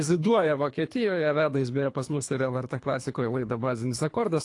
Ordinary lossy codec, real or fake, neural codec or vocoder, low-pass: AAC, 48 kbps; fake; codec, 24 kHz, 3 kbps, HILCodec; 10.8 kHz